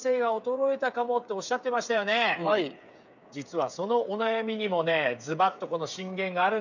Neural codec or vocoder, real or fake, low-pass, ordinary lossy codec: codec, 16 kHz, 8 kbps, FreqCodec, smaller model; fake; 7.2 kHz; none